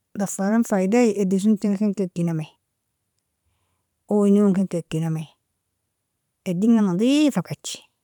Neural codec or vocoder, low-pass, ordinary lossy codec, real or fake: vocoder, 44.1 kHz, 128 mel bands every 512 samples, BigVGAN v2; 19.8 kHz; none; fake